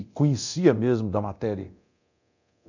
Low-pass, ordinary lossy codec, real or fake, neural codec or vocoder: 7.2 kHz; none; fake; codec, 24 kHz, 0.9 kbps, DualCodec